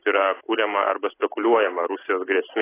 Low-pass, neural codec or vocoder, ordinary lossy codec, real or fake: 3.6 kHz; none; AAC, 16 kbps; real